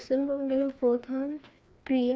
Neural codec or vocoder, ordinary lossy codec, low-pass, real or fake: codec, 16 kHz, 2 kbps, FreqCodec, larger model; none; none; fake